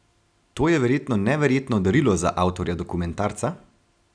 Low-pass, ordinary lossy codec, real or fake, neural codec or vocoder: 9.9 kHz; none; real; none